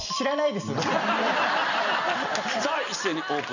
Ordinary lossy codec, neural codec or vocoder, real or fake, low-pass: none; none; real; 7.2 kHz